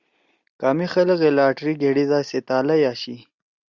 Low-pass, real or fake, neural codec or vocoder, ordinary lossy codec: 7.2 kHz; real; none; Opus, 64 kbps